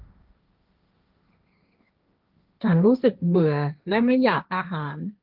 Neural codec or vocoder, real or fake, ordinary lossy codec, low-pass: codec, 16 kHz, 1.1 kbps, Voila-Tokenizer; fake; Opus, 24 kbps; 5.4 kHz